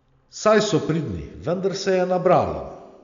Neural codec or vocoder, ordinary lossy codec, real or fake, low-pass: none; MP3, 64 kbps; real; 7.2 kHz